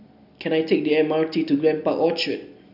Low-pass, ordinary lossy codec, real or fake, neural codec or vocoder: 5.4 kHz; none; real; none